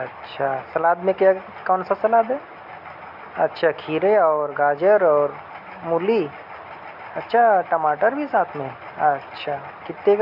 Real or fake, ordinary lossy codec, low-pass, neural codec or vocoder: real; none; 5.4 kHz; none